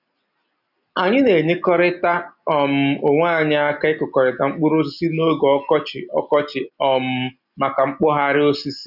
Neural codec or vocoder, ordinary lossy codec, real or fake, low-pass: none; none; real; 5.4 kHz